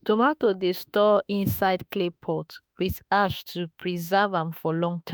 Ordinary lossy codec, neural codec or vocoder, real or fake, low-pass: none; autoencoder, 48 kHz, 32 numbers a frame, DAC-VAE, trained on Japanese speech; fake; none